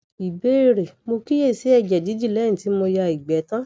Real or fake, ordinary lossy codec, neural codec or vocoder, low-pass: real; none; none; none